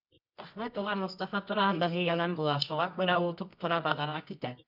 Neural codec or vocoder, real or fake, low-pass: codec, 24 kHz, 0.9 kbps, WavTokenizer, medium music audio release; fake; 5.4 kHz